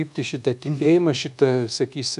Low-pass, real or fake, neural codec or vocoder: 10.8 kHz; fake; codec, 24 kHz, 1.2 kbps, DualCodec